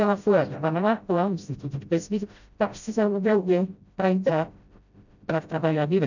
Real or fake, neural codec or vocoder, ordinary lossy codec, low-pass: fake; codec, 16 kHz, 0.5 kbps, FreqCodec, smaller model; none; 7.2 kHz